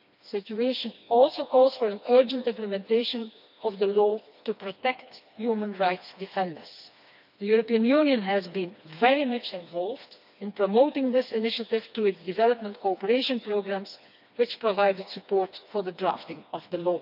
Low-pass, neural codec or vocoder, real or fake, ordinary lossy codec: 5.4 kHz; codec, 16 kHz, 2 kbps, FreqCodec, smaller model; fake; none